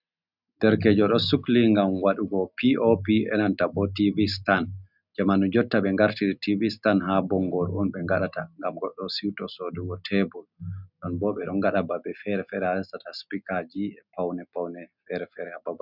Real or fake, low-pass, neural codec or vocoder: real; 5.4 kHz; none